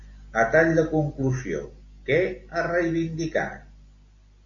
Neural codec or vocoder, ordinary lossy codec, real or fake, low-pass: none; AAC, 48 kbps; real; 7.2 kHz